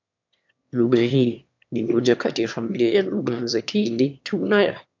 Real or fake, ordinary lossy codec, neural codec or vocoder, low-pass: fake; MP3, 64 kbps; autoencoder, 22.05 kHz, a latent of 192 numbers a frame, VITS, trained on one speaker; 7.2 kHz